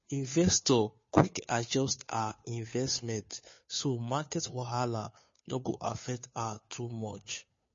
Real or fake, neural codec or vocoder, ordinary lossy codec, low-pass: fake; codec, 16 kHz, 2 kbps, FunCodec, trained on Chinese and English, 25 frames a second; MP3, 32 kbps; 7.2 kHz